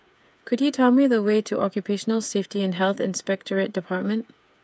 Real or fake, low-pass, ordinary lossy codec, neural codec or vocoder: fake; none; none; codec, 16 kHz, 8 kbps, FreqCodec, smaller model